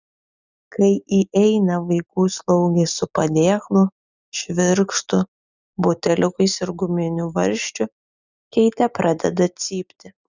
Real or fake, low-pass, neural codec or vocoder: real; 7.2 kHz; none